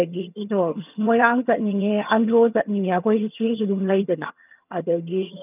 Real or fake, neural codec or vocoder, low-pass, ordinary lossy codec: fake; vocoder, 22.05 kHz, 80 mel bands, HiFi-GAN; 3.6 kHz; none